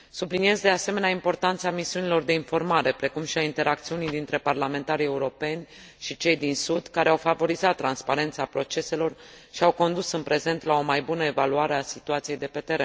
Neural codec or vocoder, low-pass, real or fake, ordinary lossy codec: none; none; real; none